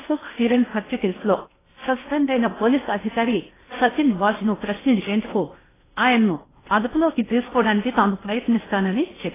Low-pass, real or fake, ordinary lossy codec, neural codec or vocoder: 3.6 kHz; fake; AAC, 16 kbps; codec, 16 kHz in and 24 kHz out, 0.8 kbps, FocalCodec, streaming, 65536 codes